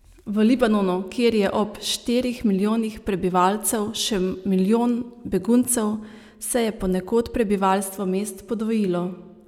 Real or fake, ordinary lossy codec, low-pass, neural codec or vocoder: real; none; 19.8 kHz; none